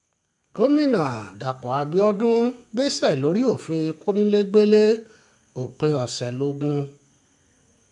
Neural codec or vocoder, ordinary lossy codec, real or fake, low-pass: codec, 32 kHz, 1.9 kbps, SNAC; none; fake; 10.8 kHz